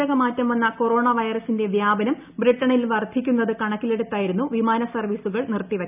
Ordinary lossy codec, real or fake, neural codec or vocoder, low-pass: none; real; none; 3.6 kHz